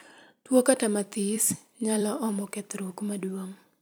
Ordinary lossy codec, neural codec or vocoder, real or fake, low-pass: none; vocoder, 44.1 kHz, 128 mel bands every 256 samples, BigVGAN v2; fake; none